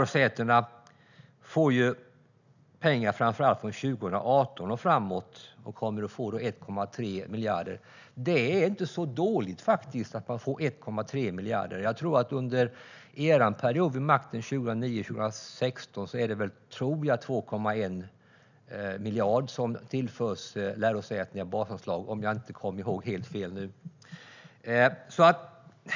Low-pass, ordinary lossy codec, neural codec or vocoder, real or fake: 7.2 kHz; none; none; real